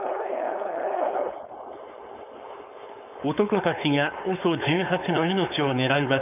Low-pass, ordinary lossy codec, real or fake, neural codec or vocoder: 3.6 kHz; none; fake; codec, 16 kHz, 4.8 kbps, FACodec